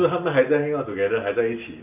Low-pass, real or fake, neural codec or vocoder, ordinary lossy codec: 3.6 kHz; real; none; none